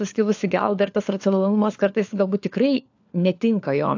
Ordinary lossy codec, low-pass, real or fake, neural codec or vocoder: AAC, 48 kbps; 7.2 kHz; fake; codec, 16 kHz, 4 kbps, FunCodec, trained on LibriTTS, 50 frames a second